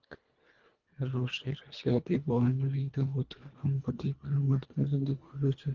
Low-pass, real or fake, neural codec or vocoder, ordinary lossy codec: 7.2 kHz; fake; codec, 24 kHz, 1.5 kbps, HILCodec; Opus, 32 kbps